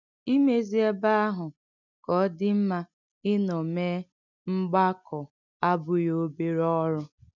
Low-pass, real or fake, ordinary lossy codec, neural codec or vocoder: 7.2 kHz; real; none; none